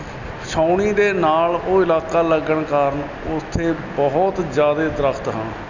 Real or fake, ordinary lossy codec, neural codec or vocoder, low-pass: real; none; none; 7.2 kHz